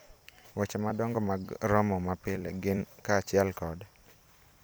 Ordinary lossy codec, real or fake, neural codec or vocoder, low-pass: none; fake; vocoder, 44.1 kHz, 128 mel bands every 256 samples, BigVGAN v2; none